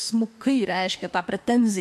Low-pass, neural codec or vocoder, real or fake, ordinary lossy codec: 14.4 kHz; autoencoder, 48 kHz, 32 numbers a frame, DAC-VAE, trained on Japanese speech; fake; MP3, 64 kbps